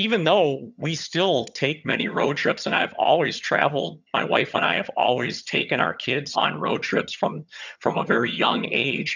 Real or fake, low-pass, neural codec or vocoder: fake; 7.2 kHz; vocoder, 22.05 kHz, 80 mel bands, HiFi-GAN